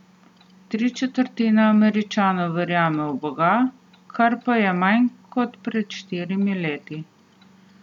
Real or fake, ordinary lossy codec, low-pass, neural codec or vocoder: real; none; 19.8 kHz; none